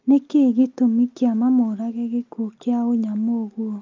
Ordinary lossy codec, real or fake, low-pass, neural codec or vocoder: Opus, 24 kbps; real; 7.2 kHz; none